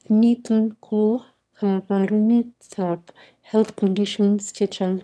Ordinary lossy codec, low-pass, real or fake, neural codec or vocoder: none; none; fake; autoencoder, 22.05 kHz, a latent of 192 numbers a frame, VITS, trained on one speaker